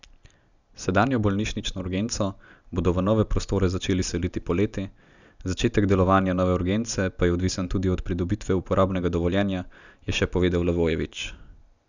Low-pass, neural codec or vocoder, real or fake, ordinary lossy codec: 7.2 kHz; none; real; none